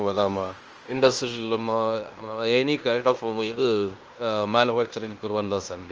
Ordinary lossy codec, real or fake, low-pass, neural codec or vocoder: Opus, 24 kbps; fake; 7.2 kHz; codec, 16 kHz in and 24 kHz out, 0.9 kbps, LongCat-Audio-Codec, fine tuned four codebook decoder